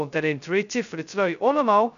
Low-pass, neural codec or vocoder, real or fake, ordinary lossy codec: 7.2 kHz; codec, 16 kHz, 0.2 kbps, FocalCodec; fake; none